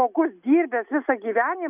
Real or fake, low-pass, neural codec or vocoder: real; 3.6 kHz; none